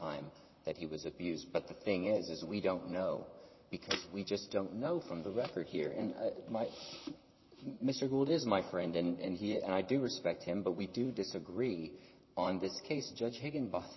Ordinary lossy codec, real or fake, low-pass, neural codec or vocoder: MP3, 24 kbps; fake; 7.2 kHz; vocoder, 44.1 kHz, 128 mel bands, Pupu-Vocoder